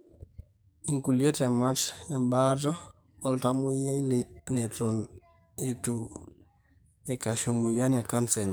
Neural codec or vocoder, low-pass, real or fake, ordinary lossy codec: codec, 44.1 kHz, 2.6 kbps, SNAC; none; fake; none